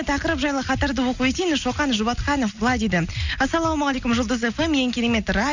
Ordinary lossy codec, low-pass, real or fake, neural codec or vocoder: none; 7.2 kHz; real; none